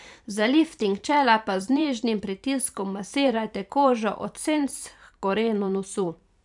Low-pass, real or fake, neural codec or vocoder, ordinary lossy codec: 10.8 kHz; fake; vocoder, 44.1 kHz, 128 mel bands every 512 samples, BigVGAN v2; none